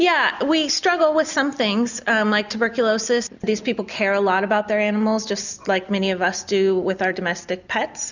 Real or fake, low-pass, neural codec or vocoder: real; 7.2 kHz; none